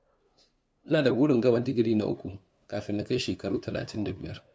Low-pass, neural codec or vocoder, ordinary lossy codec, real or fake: none; codec, 16 kHz, 2 kbps, FunCodec, trained on LibriTTS, 25 frames a second; none; fake